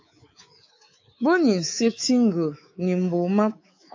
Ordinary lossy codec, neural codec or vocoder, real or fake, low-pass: AAC, 48 kbps; codec, 24 kHz, 3.1 kbps, DualCodec; fake; 7.2 kHz